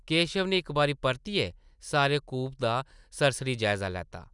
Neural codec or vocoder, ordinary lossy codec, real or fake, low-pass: none; none; real; 10.8 kHz